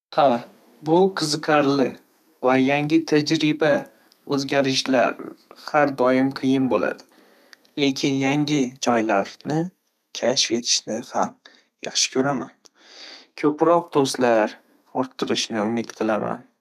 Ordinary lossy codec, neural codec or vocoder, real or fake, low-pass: none; codec, 32 kHz, 1.9 kbps, SNAC; fake; 14.4 kHz